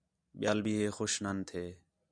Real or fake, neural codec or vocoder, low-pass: real; none; 9.9 kHz